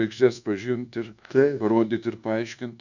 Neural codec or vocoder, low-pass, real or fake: codec, 24 kHz, 1.2 kbps, DualCodec; 7.2 kHz; fake